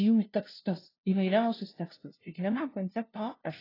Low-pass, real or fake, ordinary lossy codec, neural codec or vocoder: 5.4 kHz; fake; AAC, 24 kbps; codec, 16 kHz, 0.5 kbps, FunCodec, trained on LibriTTS, 25 frames a second